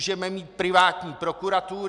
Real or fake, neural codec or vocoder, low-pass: real; none; 10.8 kHz